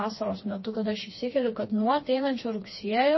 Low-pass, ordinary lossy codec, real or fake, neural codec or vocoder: 7.2 kHz; MP3, 24 kbps; fake; codec, 16 kHz, 2 kbps, FreqCodec, smaller model